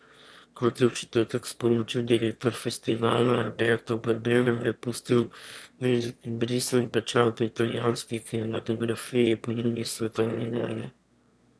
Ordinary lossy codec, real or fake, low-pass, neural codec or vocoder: none; fake; none; autoencoder, 22.05 kHz, a latent of 192 numbers a frame, VITS, trained on one speaker